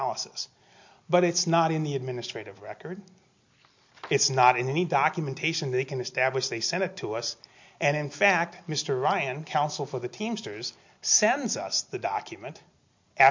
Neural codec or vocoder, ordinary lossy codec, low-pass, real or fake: none; MP3, 48 kbps; 7.2 kHz; real